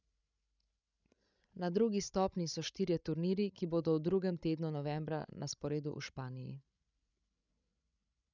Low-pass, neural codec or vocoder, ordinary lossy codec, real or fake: 7.2 kHz; codec, 16 kHz, 16 kbps, FreqCodec, larger model; none; fake